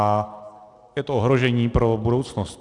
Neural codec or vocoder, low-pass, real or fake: none; 10.8 kHz; real